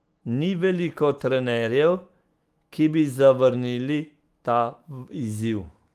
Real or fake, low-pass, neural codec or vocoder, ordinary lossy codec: fake; 14.4 kHz; autoencoder, 48 kHz, 128 numbers a frame, DAC-VAE, trained on Japanese speech; Opus, 24 kbps